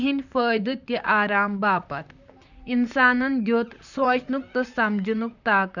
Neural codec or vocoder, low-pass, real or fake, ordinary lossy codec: vocoder, 44.1 kHz, 128 mel bands, Pupu-Vocoder; 7.2 kHz; fake; none